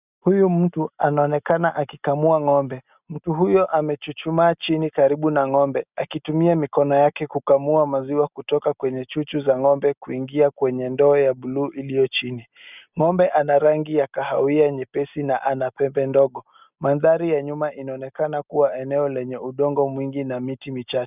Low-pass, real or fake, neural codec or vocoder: 3.6 kHz; real; none